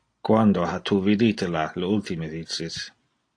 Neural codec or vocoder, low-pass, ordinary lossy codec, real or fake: none; 9.9 kHz; AAC, 48 kbps; real